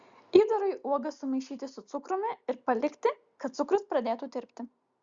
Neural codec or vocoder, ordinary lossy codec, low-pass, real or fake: none; Opus, 64 kbps; 7.2 kHz; real